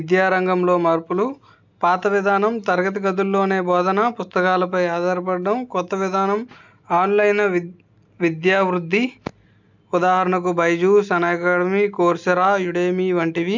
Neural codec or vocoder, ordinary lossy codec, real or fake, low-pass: none; MP3, 64 kbps; real; 7.2 kHz